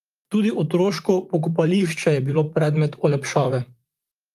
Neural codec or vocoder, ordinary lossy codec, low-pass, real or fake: vocoder, 44.1 kHz, 128 mel bands, Pupu-Vocoder; Opus, 32 kbps; 14.4 kHz; fake